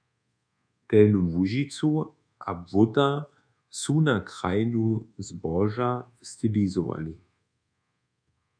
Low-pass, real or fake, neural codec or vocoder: 9.9 kHz; fake; codec, 24 kHz, 1.2 kbps, DualCodec